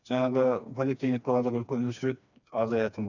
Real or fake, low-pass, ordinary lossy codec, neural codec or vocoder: fake; 7.2 kHz; none; codec, 16 kHz, 2 kbps, FreqCodec, smaller model